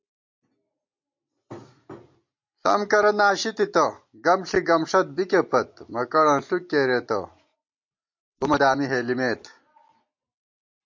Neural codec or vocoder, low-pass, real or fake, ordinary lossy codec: none; 7.2 kHz; real; MP3, 48 kbps